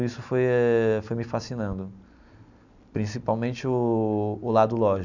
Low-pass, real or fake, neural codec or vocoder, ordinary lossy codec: 7.2 kHz; real; none; none